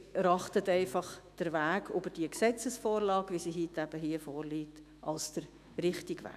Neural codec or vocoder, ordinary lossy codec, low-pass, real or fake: autoencoder, 48 kHz, 128 numbers a frame, DAC-VAE, trained on Japanese speech; none; 14.4 kHz; fake